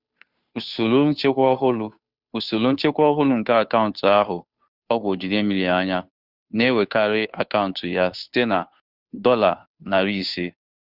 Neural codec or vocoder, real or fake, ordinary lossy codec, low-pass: codec, 16 kHz, 2 kbps, FunCodec, trained on Chinese and English, 25 frames a second; fake; Opus, 64 kbps; 5.4 kHz